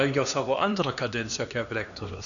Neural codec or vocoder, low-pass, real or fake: codec, 16 kHz, 2 kbps, X-Codec, HuBERT features, trained on LibriSpeech; 7.2 kHz; fake